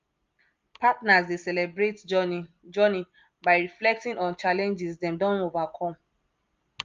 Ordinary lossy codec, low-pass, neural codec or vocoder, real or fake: Opus, 32 kbps; 7.2 kHz; none; real